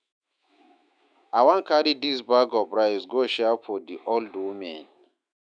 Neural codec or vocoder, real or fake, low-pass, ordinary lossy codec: autoencoder, 48 kHz, 128 numbers a frame, DAC-VAE, trained on Japanese speech; fake; 9.9 kHz; none